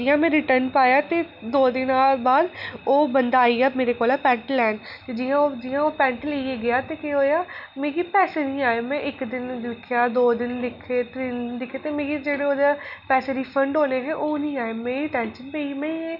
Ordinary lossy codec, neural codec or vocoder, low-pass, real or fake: none; none; 5.4 kHz; real